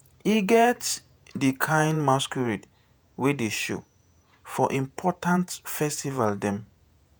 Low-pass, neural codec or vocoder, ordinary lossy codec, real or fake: none; vocoder, 48 kHz, 128 mel bands, Vocos; none; fake